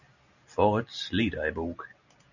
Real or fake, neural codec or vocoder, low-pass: real; none; 7.2 kHz